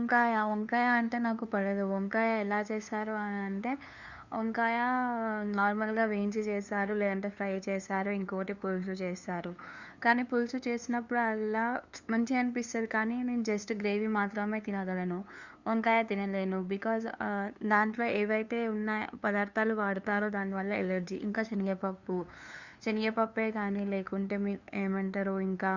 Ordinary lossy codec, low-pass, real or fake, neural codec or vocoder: Opus, 64 kbps; 7.2 kHz; fake; codec, 16 kHz, 2 kbps, FunCodec, trained on LibriTTS, 25 frames a second